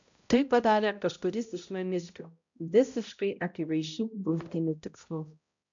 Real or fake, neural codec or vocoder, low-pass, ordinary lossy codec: fake; codec, 16 kHz, 0.5 kbps, X-Codec, HuBERT features, trained on balanced general audio; 7.2 kHz; MP3, 64 kbps